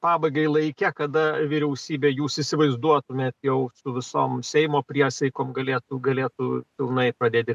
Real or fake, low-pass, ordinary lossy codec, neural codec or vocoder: real; 14.4 kHz; AAC, 96 kbps; none